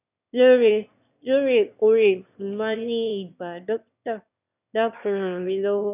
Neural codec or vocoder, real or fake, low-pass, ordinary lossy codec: autoencoder, 22.05 kHz, a latent of 192 numbers a frame, VITS, trained on one speaker; fake; 3.6 kHz; none